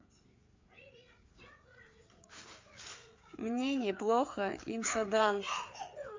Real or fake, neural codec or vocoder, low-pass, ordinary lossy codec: fake; codec, 16 kHz, 4 kbps, FreqCodec, larger model; 7.2 kHz; MP3, 48 kbps